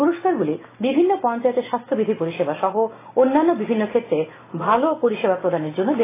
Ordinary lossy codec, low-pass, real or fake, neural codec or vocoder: AAC, 16 kbps; 3.6 kHz; real; none